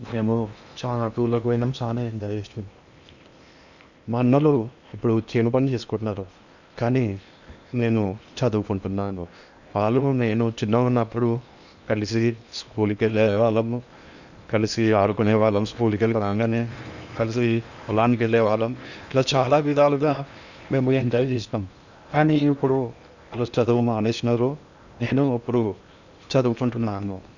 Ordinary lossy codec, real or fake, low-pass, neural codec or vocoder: none; fake; 7.2 kHz; codec, 16 kHz in and 24 kHz out, 0.8 kbps, FocalCodec, streaming, 65536 codes